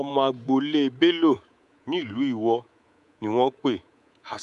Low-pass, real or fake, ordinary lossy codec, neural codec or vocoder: 10.8 kHz; fake; MP3, 96 kbps; codec, 24 kHz, 3.1 kbps, DualCodec